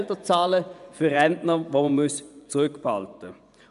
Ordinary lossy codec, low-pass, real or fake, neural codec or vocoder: none; 10.8 kHz; fake; vocoder, 24 kHz, 100 mel bands, Vocos